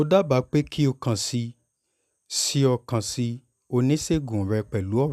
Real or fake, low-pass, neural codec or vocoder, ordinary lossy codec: real; 14.4 kHz; none; none